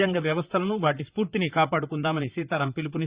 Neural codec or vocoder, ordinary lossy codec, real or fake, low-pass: vocoder, 44.1 kHz, 128 mel bands, Pupu-Vocoder; Opus, 64 kbps; fake; 3.6 kHz